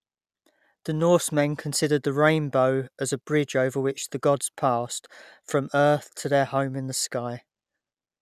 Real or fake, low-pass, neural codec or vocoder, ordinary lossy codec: real; 14.4 kHz; none; none